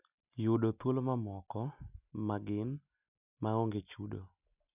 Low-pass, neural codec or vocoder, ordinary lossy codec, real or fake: 3.6 kHz; none; none; real